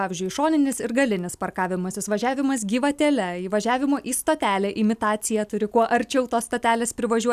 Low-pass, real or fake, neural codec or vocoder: 14.4 kHz; real; none